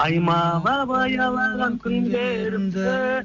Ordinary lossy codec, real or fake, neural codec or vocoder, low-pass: none; real; none; 7.2 kHz